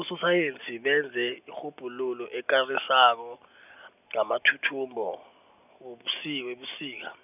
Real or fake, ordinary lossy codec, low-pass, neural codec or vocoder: real; none; 3.6 kHz; none